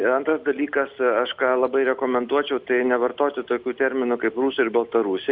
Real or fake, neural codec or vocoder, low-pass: real; none; 5.4 kHz